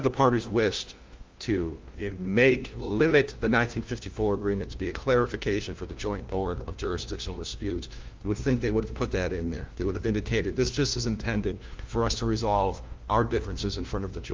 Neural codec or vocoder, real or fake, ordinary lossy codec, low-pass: codec, 16 kHz, 1 kbps, FunCodec, trained on LibriTTS, 50 frames a second; fake; Opus, 32 kbps; 7.2 kHz